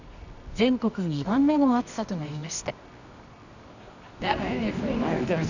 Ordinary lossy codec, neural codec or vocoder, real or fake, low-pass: none; codec, 24 kHz, 0.9 kbps, WavTokenizer, medium music audio release; fake; 7.2 kHz